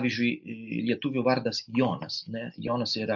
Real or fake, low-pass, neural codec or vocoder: real; 7.2 kHz; none